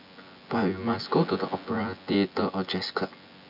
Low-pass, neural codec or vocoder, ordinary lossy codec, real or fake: 5.4 kHz; vocoder, 24 kHz, 100 mel bands, Vocos; none; fake